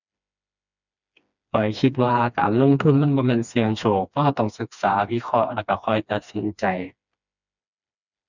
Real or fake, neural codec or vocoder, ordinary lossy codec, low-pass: fake; codec, 16 kHz, 2 kbps, FreqCodec, smaller model; none; 7.2 kHz